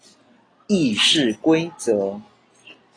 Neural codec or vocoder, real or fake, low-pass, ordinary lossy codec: none; real; 9.9 kHz; AAC, 32 kbps